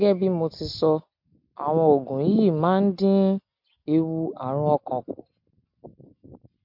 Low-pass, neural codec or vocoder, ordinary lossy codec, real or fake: 5.4 kHz; none; MP3, 48 kbps; real